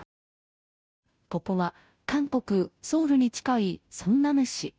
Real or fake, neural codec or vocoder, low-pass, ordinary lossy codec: fake; codec, 16 kHz, 0.5 kbps, FunCodec, trained on Chinese and English, 25 frames a second; none; none